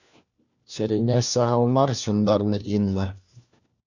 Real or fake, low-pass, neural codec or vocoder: fake; 7.2 kHz; codec, 16 kHz, 1 kbps, FunCodec, trained on LibriTTS, 50 frames a second